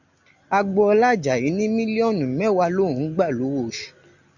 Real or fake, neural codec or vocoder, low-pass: real; none; 7.2 kHz